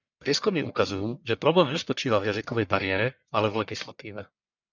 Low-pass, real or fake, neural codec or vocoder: 7.2 kHz; fake; codec, 44.1 kHz, 1.7 kbps, Pupu-Codec